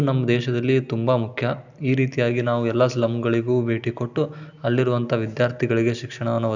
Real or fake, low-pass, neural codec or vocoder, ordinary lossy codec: real; 7.2 kHz; none; none